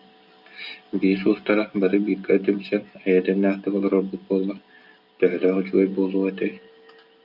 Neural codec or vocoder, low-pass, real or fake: none; 5.4 kHz; real